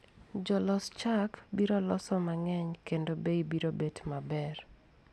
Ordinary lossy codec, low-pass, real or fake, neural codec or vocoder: none; none; real; none